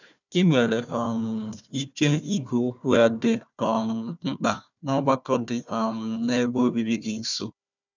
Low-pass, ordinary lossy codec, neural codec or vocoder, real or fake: 7.2 kHz; none; codec, 16 kHz, 1 kbps, FunCodec, trained on Chinese and English, 50 frames a second; fake